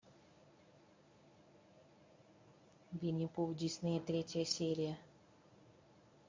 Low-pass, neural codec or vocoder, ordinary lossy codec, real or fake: 7.2 kHz; codec, 24 kHz, 0.9 kbps, WavTokenizer, medium speech release version 1; MP3, 48 kbps; fake